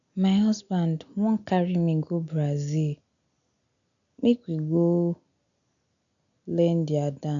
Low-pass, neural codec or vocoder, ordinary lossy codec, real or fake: 7.2 kHz; none; none; real